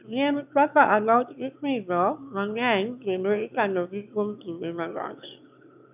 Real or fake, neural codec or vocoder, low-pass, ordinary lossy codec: fake; autoencoder, 22.05 kHz, a latent of 192 numbers a frame, VITS, trained on one speaker; 3.6 kHz; none